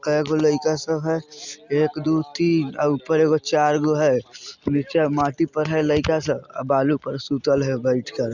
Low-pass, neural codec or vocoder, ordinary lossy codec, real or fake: 7.2 kHz; none; Opus, 64 kbps; real